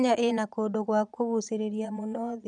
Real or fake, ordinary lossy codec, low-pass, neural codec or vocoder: fake; none; 9.9 kHz; vocoder, 22.05 kHz, 80 mel bands, Vocos